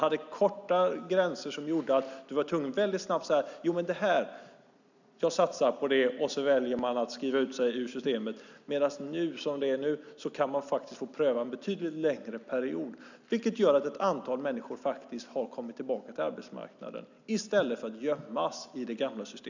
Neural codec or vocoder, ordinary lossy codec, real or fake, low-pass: none; none; real; 7.2 kHz